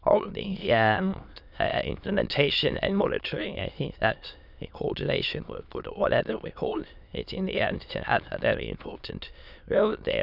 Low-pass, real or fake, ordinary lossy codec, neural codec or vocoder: 5.4 kHz; fake; none; autoencoder, 22.05 kHz, a latent of 192 numbers a frame, VITS, trained on many speakers